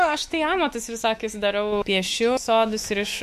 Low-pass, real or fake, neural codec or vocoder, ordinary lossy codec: 14.4 kHz; fake; autoencoder, 48 kHz, 128 numbers a frame, DAC-VAE, trained on Japanese speech; MP3, 64 kbps